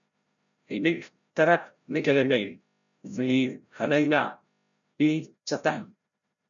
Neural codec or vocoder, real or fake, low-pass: codec, 16 kHz, 0.5 kbps, FreqCodec, larger model; fake; 7.2 kHz